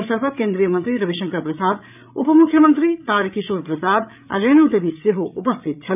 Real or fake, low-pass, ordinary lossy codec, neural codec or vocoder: fake; 3.6 kHz; none; codec, 16 kHz, 8 kbps, FreqCodec, larger model